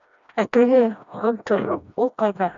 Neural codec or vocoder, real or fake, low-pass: codec, 16 kHz, 1 kbps, FreqCodec, smaller model; fake; 7.2 kHz